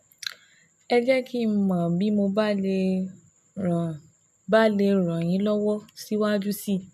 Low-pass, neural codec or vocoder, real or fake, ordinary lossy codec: 14.4 kHz; none; real; none